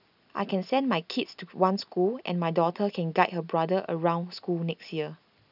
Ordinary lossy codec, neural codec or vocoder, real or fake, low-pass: none; none; real; 5.4 kHz